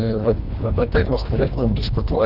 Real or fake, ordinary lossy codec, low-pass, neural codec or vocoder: fake; Opus, 64 kbps; 5.4 kHz; codec, 24 kHz, 1.5 kbps, HILCodec